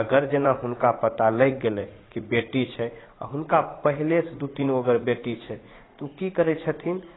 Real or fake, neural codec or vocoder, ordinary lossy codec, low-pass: fake; vocoder, 44.1 kHz, 80 mel bands, Vocos; AAC, 16 kbps; 7.2 kHz